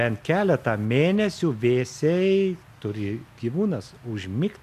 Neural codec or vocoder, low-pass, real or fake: none; 14.4 kHz; real